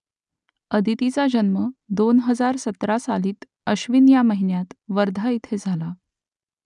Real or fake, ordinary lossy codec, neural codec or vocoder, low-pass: real; none; none; 10.8 kHz